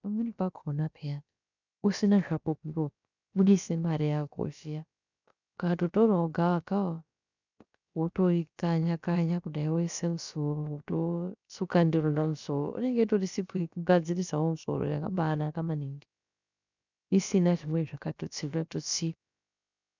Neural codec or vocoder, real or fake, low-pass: codec, 16 kHz, about 1 kbps, DyCAST, with the encoder's durations; fake; 7.2 kHz